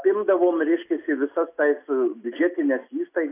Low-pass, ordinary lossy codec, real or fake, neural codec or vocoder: 3.6 kHz; AAC, 24 kbps; real; none